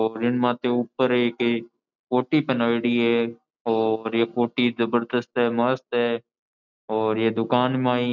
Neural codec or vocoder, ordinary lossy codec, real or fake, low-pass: none; none; real; 7.2 kHz